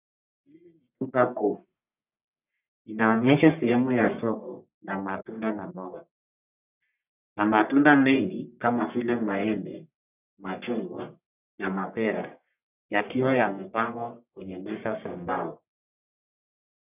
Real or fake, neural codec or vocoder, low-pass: fake; codec, 44.1 kHz, 1.7 kbps, Pupu-Codec; 3.6 kHz